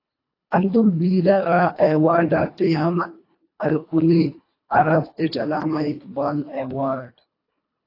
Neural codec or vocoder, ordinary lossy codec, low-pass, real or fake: codec, 24 kHz, 1.5 kbps, HILCodec; AAC, 32 kbps; 5.4 kHz; fake